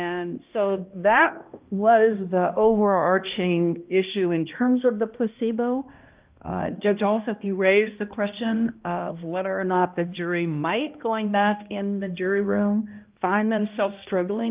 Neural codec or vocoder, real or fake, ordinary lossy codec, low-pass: codec, 16 kHz, 1 kbps, X-Codec, HuBERT features, trained on balanced general audio; fake; Opus, 32 kbps; 3.6 kHz